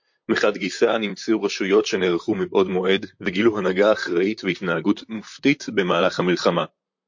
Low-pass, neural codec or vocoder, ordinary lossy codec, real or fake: 7.2 kHz; vocoder, 44.1 kHz, 128 mel bands, Pupu-Vocoder; MP3, 48 kbps; fake